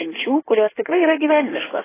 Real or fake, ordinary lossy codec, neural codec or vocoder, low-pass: fake; AAC, 16 kbps; codec, 16 kHz in and 24 kHz out, 1.1 kbps, FireRedTTS-2 codec; 3.6 kHz